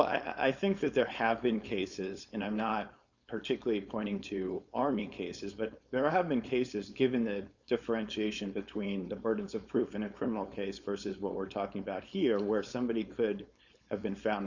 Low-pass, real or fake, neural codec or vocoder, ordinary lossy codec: 7.2 kHz; fake; codec, 16 kHz, 4.8 kbps, FACodec; Opus, 64 kbps